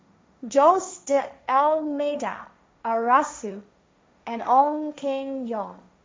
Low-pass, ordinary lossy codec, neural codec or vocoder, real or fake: none; none; codec, 16 kHz, 1.1 kbps, Voila-Tokenizer; fake